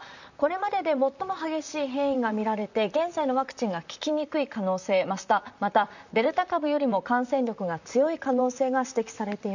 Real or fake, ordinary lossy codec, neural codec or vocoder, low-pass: fake; none; vocoder, 44.1 kHz, 128 mel bands, Pupu-Vocoder; 7.2 kHz